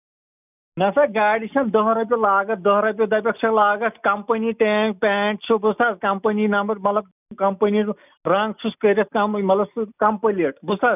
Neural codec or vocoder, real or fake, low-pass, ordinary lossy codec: none; real; 3.6 kHz; none